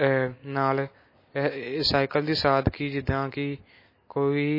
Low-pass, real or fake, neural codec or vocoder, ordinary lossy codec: 5.4 kHz; real; none; MP3, 24 kbps